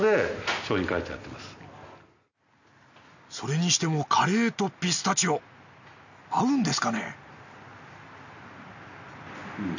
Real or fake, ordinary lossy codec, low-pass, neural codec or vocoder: real; none; 7.2 kHz; none